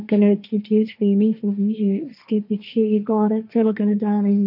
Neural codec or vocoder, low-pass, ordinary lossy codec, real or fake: codec, 16 kHz, 1.1 kbps, Voila-Tokenizer; 5.4 kHz; none; fake